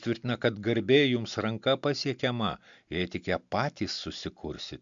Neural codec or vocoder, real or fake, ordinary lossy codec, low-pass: none; real; MP3, 64 kbps; 7.2 kHz